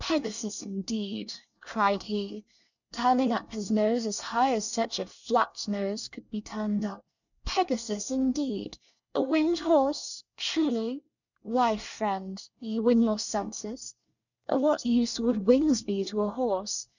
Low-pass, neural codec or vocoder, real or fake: 7.2 kHz; codec, 24 kHz, 1 kbps, SNAC; fake